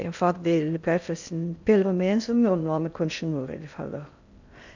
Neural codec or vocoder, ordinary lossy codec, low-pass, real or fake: codec, 16 kHz in and 24 kHz out, 0.6 kbps, FocalCodec, streaming, 2048 codes; none; 7.2 kHz; fake